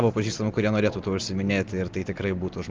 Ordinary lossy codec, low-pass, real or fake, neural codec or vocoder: Opus, 32 kbps; 7.2 kHz; real; none